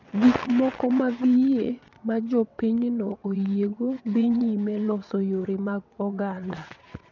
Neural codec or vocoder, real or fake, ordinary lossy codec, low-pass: vocoder, 22.05 kHz, 80 mel bands, WaveNeXt; fake; none; 7.2 kHz